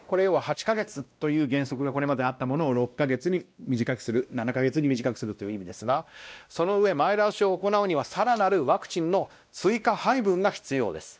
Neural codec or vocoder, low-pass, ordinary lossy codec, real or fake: codec, 16 kHz, 1 kbps, X-Codec, WavLM features, trained on Multilingual LibriSpeech; none; none; fake